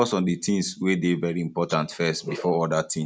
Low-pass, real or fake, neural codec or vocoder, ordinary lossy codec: none; real; none; none